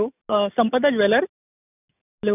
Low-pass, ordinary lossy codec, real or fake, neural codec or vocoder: 3.6 kHz; none; real; none